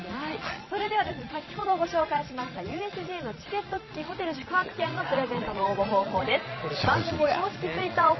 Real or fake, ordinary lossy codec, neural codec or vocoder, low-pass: fake; MP3, 24 kbps; vocoder, 22.05 kHz, 80 mel bands, Vocos; 7.2 kHz